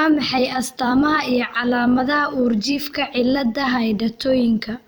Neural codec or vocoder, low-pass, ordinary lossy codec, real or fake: vocoder, 44.1 kHz, 128 mel bands every 256 samples, BigVGAN v2; none; none; fake